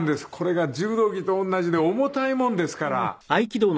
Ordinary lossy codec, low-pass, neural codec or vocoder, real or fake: none; none; none; real